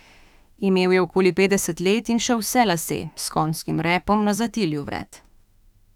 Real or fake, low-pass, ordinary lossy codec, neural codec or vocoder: fake; 19.8 kHz; none; autoencoder, 48 kHz, 32 numbers a frame, DAC-VAE, trained on Japanese speech